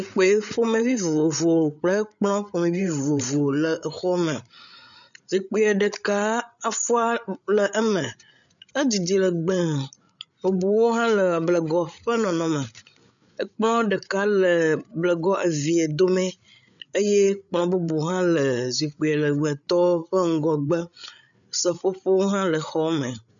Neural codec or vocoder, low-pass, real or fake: codec, 16 kHz, 8 kbps, FreqCodec, larger model; 7.2 kHz; fake